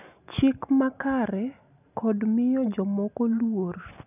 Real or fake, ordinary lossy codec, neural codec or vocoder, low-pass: real; none; none; 3.6 kHz